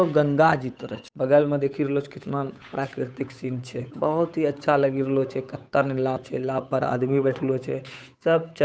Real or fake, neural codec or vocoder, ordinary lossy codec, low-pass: fake; codec, 16 kHz, 8 kbps, FunCodec, trained on Chinese and English, 25 frames a second; none; none